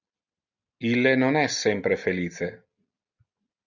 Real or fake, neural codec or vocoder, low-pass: real; none; 7.2 kHz